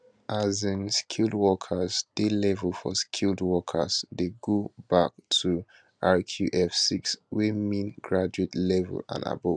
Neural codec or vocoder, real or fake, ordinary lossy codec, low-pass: none; real; none; 9.9 kHz